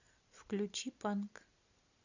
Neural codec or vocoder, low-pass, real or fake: none; 7.2 kHz; real